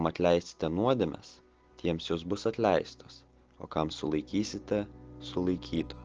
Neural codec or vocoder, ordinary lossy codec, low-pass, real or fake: none; Opus, 16 kbps; 7.2 kHz; real